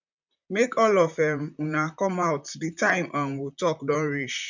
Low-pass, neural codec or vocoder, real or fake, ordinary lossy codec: 7.2 kHz; vocoder, 44.1 kHz, 128 mel bands, Pupu-Vocoder; fake; none